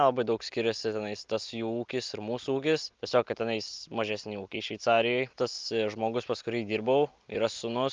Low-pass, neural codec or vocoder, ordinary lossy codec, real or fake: 7.2 kHz; none; Opus, 24 kbps; real